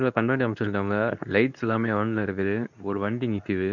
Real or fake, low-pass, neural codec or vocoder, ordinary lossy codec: fake; 7.2 kHz; codec, 24 kHz, 0.9 kbps, WavTokenizer, medium speech release version 2; none